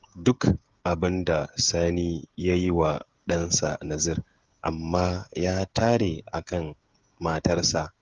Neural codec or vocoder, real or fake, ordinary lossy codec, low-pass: codec, 16 kHz, 16 kbps, FreqCodec, smaller model; fake; Opus, 24 kbps; 7.2 kHz